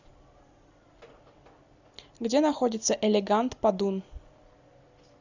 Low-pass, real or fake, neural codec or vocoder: 7.2 kHz; real; none